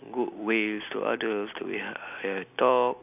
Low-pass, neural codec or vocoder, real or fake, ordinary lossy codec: 3.6 kHz; none; real; none